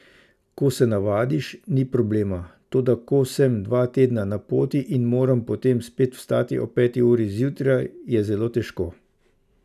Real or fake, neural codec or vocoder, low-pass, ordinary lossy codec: real; none; 14.4 kHz; none